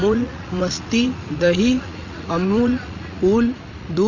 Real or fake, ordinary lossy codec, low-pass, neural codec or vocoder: fake; Opus, 64 kbps; 7.2 kHz; vocoder, 44.1 kHz, 80 mel bands, Vocos